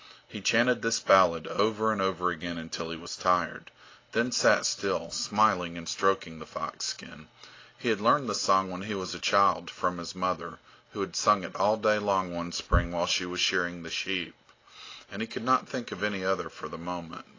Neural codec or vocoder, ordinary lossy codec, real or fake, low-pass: none; AAC, 32 kbps; real; 7.2 kHz